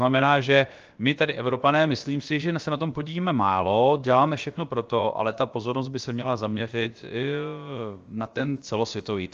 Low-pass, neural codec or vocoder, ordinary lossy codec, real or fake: 7.2 kHz; codec, 16 kHz, about 1 kbps, DyCAST, with the encoder's durations; Opus, 32 kbps; fake